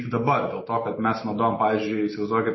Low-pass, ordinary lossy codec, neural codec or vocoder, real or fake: 7.2 kHz; MP3, 24 kbps; none; real